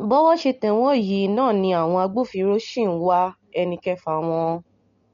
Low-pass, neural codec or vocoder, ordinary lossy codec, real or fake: 7.2 kHz; none; MP3, 48 kbps; real